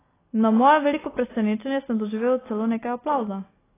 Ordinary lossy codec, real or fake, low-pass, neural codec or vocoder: AAC, 16 kbps; real; 3.6 kHz; none